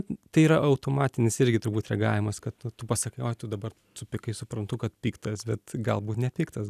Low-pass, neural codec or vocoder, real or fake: 14.4 kHz; none; real